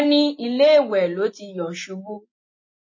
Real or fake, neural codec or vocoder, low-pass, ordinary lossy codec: real; none; 7.2 kHz; MP3, 32 kbps